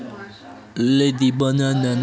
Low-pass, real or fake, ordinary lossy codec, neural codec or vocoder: none; real; none; none